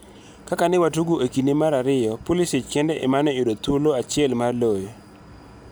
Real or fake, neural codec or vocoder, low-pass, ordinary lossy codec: real; none; none; none